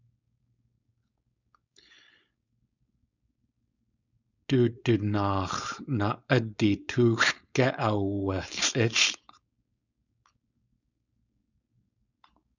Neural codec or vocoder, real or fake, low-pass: codec, 16 kHz, 4.8 kbps, FACodec; fake; 7.2 kHz